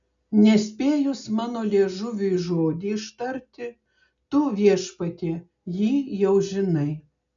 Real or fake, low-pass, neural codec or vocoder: real; 7.2 kHz; none